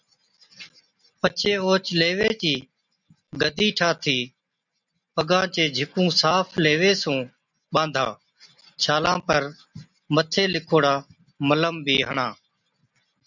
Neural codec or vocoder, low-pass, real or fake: none; 7.2 kHz; real